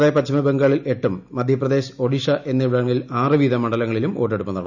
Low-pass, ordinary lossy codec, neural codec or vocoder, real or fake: 7.2 kHz; none; none; real